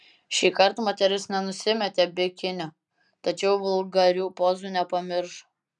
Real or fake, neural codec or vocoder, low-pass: real; none; 10.8 kHz